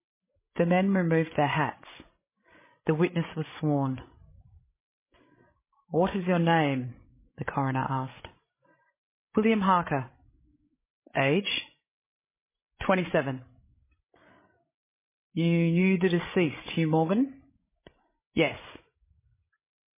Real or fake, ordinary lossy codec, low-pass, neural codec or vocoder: fake; MP3, 16 kbps; 3.6 kHz; codec, 16 kHz, 16 kbps, FreqCodec, larger model